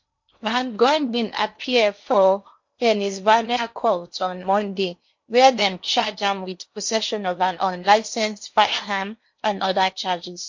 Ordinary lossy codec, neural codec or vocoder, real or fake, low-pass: MP3, 48 kbps; codec, 16 kHz in and 24 kHz out, 0.8 kbps, FocalCodec, streaming, 65536 codes; fake; 7.2 kHz